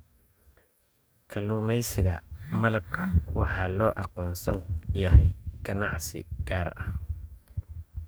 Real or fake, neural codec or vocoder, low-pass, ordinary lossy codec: fake; codec, 44.1 kHz, 2.6 kbps, DAC; none; none